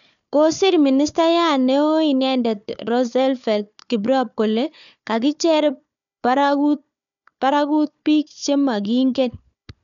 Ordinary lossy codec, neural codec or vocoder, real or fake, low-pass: none; codec, 16 kHz, 4 kbps, FunCodec, trained on Chinese and English, 50 frames a second; fake; 7.2 kHz